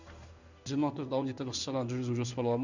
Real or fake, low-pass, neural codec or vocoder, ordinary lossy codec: fake; 7.2 kHz; codec, 16 kHz in and 24 kHz out, 1 kbps, XY-Tokenizer; none